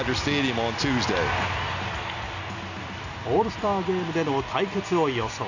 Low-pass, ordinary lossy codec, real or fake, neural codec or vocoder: 7.2 kHz; none; real; none